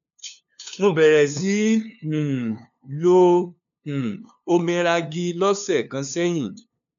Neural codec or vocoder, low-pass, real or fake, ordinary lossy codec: codec, 16 kHz, 2 kbps, FunCodec, trained on LibriTTS, 25 frames a second; 7.2 kHz; fake; none